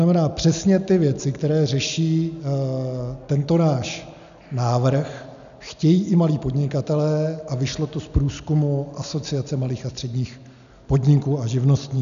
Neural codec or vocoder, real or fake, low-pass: none; real; 7.2 kHz